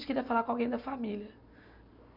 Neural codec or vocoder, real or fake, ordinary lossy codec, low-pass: none; real; none; 5.4 kHz